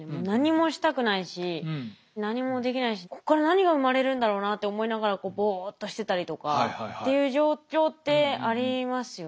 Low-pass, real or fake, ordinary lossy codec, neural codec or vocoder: none; real; none; none